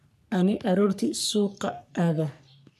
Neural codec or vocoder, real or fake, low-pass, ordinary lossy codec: codec, 44.1 kHz, 3.4 kbps, Pupu-Codec; fake; 14.4 kHz; none